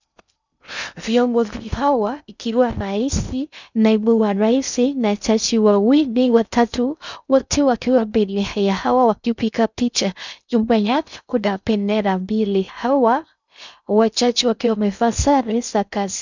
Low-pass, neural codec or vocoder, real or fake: 7.2 kHz; codec, 16 kHz in and 24 kHz out, 0.6 kbps, FocalCodec, streaming, 2048 codes; fake